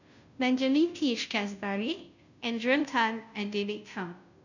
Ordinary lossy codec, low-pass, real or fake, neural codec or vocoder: none; 7.2 kHz; fake; codec, 16 kHz, 0.5 kbps, FunCodec, trained on Chinese and English, 25 frames a second